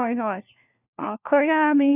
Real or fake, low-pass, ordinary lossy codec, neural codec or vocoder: fake; 3.6 kHz; none; codec, 16 kHz, 1 kbps, FunCodec, trained on LibriTTS, 50 frames a second